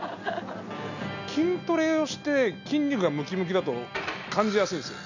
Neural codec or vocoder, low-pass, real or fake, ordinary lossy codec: none; 7.2 kHz; real; none